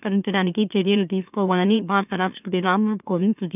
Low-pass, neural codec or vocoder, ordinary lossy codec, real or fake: 3.6 kHz; autoencoder, 44.1 kHz, a latent of 192 numbers a frame, MeloTTS; none; fake